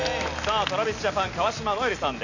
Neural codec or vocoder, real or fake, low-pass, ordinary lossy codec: none; real; 7.2 kHz; none